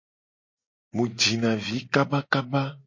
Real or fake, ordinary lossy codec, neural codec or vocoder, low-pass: real; MP3, 32 kbps; none; 7.2 kHz